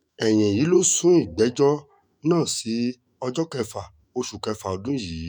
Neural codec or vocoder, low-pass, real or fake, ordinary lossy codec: autoencoder, 48 kHz, 128 numbers a frame, DAC-VAE, trained on Japanese speech; none; fake; none